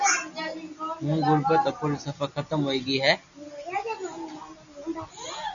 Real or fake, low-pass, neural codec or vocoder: real; 7.2 kHz; none